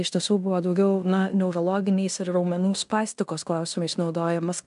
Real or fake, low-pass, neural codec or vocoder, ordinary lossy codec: fake; 10.8 kHz; codec, 16 kHz in and 24 kHz out, 0.9 kbps, LongCat-Audio-Codec, fine tuned four codebook decoder; MP3, 96 kbps